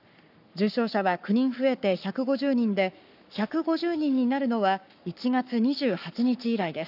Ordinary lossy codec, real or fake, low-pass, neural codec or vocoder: none; fake; 5.4 kHz; codec, 44.1 kHz, 7.8 kbps, Pupu-Codec